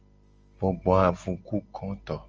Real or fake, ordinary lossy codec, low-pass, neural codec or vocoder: real; Opus, 24 kbps; 7.2 kHz; none